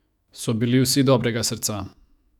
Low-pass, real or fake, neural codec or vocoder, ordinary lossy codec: 19.8 kHz; fake; autoencoder, 48 kHz, 128 numbers a frame, DAC-VAE, trained on Japanese speech; none